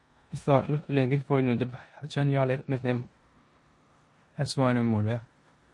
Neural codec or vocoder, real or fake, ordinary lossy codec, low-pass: codec, 16 kHz in and 24 kHz out, 0.9 kbps, LongCat-Audio-Codec, four codebook decoder; fake; MP3, 48 kbps; 10.8 kHz